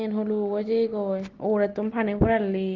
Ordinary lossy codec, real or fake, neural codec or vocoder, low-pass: Opus, 16 kbps; real; none; 7.2 kHz